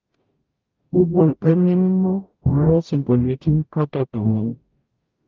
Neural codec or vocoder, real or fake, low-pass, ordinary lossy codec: codec, 44.1 kHz, 0.9 kbps, DAC; fake; 7.2 kHz; Opus, 24 kbps